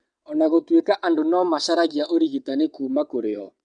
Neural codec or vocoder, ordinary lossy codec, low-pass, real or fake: vocoder, 22.05 kHz, 80 mel bands, WaveNeXt; none; 9.9 kHz; fake